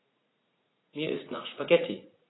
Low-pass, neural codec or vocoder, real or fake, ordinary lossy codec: 7.2 kHz; vocoder, 44.1 kHz, 80 mel bands, Vocos; fake; AAC, 16 kbps